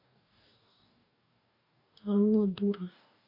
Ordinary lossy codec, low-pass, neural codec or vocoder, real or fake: none; 5.4 kHz; codec, 44.1 kHz, 2.6 kbps, DAC; fake